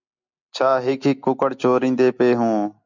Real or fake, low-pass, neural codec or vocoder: real; 7.2 kHz; none